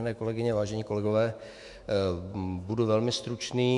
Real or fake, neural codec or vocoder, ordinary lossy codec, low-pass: fake; autoencoder, 48 kHz, 128 numbers a frame, DAC-VAE, trained on Japanese speech; MP3, 64 kbps; 10.8 kHz